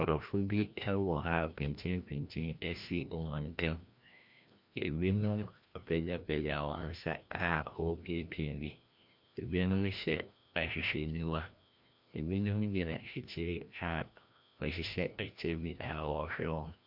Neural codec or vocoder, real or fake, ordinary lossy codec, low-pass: codec, 16 kHz, 1 kbps, FreqCodec, larger model; fake; MP3, 48 kbps; 5.4 kHz